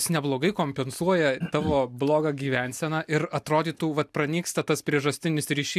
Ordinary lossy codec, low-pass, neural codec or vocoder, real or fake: MP3, 96 kbps; 14.4 kHz; none; real